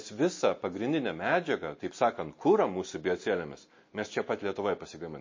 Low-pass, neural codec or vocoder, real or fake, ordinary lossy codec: 7.2 kHz; none; real; MP3, 32 kbps